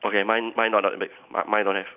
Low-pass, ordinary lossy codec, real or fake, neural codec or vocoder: 3.6 kHz; none; real; none